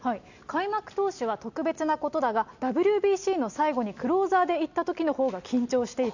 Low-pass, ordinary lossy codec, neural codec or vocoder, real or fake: 7.2 kHz; none; none; real